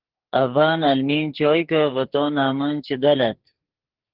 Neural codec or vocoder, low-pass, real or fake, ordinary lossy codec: codec, 44.1 kHz, 2.6 kbps, SNAC; 5.4 kHz; fake; Opus, 16 kbps